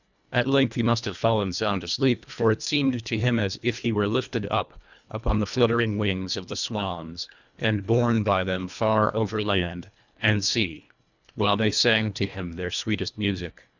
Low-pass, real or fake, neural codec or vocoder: 7.2 kHz; fake; codec, 24 kHz, 1.5 kbps, HILCodec